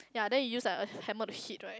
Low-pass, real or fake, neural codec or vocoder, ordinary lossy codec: none; real; none; none